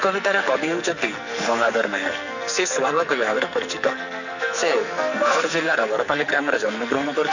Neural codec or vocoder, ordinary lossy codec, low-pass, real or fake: codec, 44.1 kHz, 2.6 kbps, SNAC; none; 7.2 kHz; fake